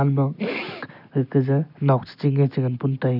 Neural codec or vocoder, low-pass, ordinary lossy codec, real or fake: none; 5.4 kHz; none; real